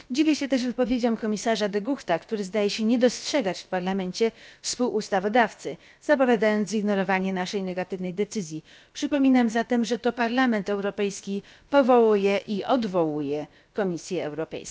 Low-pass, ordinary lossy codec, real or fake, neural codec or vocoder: none; none; fake; codec, 16 kHz, about 1 kbps, DyCAST, with the encoder's durations